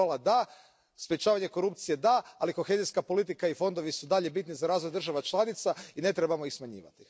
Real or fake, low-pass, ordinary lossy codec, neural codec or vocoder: real; none; none; none